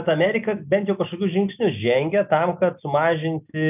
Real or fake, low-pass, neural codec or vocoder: real; 3.6 kHz; none